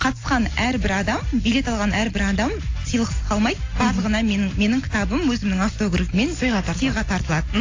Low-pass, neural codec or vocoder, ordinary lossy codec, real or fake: 7.2 kHz; none; AAC, 32 kbps; real